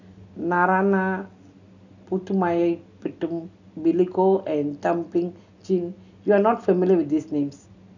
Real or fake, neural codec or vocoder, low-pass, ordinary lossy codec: real; none; 7.2 kHz; none